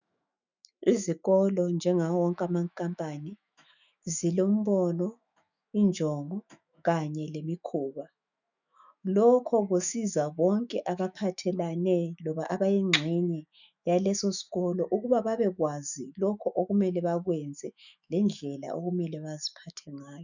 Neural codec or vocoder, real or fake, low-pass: autoencoder, 48 kHz, 128 numbers a frame, DAC-VAE, trained on Japanese speech; fake; 7.2 kHz